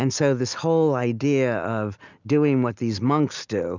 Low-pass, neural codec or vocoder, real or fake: 7.2 kHz; none; real